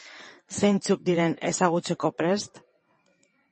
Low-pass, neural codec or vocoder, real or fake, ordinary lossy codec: 9.9 kHz; vocoder, 22.05 kHz, 80 mel bands, WaveNeXt; fake; MP3, 32 kbps